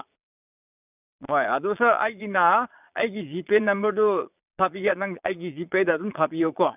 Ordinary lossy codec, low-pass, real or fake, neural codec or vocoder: none; 3.6 kHz; fake; vocoder, 22.05 kHz, 80 mel bands, Vocos